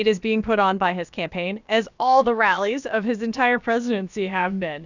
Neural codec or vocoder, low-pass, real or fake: codec, 16 kHz, about 1 kbps, DyCAST, with the encoder's durations; 7.2 kHz; fake